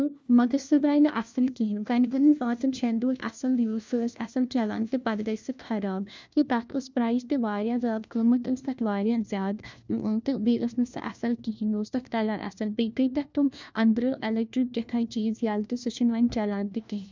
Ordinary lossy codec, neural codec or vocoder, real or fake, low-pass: none; codec, 16 kHz, 1 kbps, FunCodec, trained on LibriTTS, 50 frames a second; fake; none